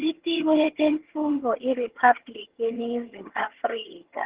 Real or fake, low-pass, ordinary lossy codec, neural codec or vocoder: fake; 3.6 kHz; Opus, 16 kbps; vocoder, 22.05 kHz, 80 mel bands, HiFi-GAN